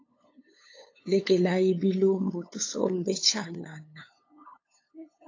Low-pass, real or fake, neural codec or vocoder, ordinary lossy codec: 7.2 kHz; fake; codec, 16 kHz, 8 kbps, FunCodec, trained on LibriTTS, 25 frames a second; AAC, 32 kbps